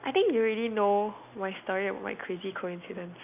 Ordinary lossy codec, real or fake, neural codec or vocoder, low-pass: none; real; none; 3.6 kHz